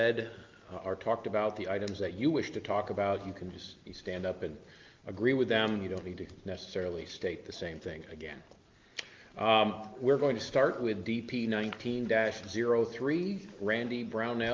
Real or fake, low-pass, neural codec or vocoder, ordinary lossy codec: real; 7.2 kHz; none; Opus, 32 kbps